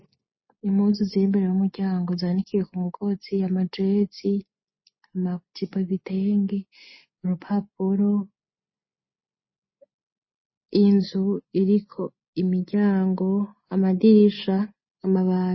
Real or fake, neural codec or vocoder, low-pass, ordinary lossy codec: real; none; 7.2 kHz; MP3, 24 kbps